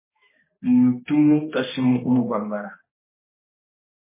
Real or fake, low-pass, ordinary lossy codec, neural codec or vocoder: fake; 3.6 kHz; MP3, 16 kbps; codec, 16 kHz, 1 kbps, X-Codec, HuBERT features, trained on balanced general audio